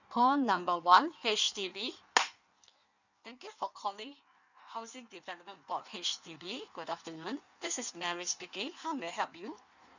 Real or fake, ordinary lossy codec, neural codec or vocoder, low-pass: fake; none; codec, 16 kHz in and 24 kHz out, 1.1 kbps, FireRedTTS-2 codec; 7.2 kHz